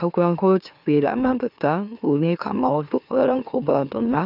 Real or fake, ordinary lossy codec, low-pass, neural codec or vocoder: fake; none; 5.4 kHz; autoencoder, 44.1 kHz, a latent of 192 numbers a frame, MeloTTS